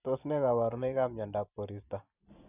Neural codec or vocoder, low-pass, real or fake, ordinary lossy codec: vocoder, 44.1 kHz, 128 mel bands every 512 samples, BigVGAN v2; 3.6 kHz; fake; none